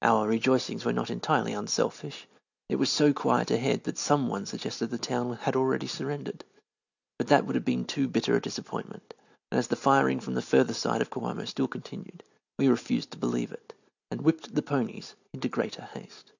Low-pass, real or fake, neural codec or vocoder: 7.2 kHz; real; none